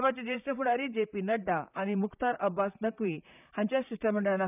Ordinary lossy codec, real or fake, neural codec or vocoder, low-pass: none; fake; vocoder, 44.1 kHz, 128 mel bands, Pupu-Vocoder; 3.6 kHz